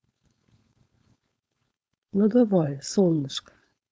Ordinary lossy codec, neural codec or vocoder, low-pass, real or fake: none; codec, 16 kHz, 4.8 kbps, FACodec; none; fake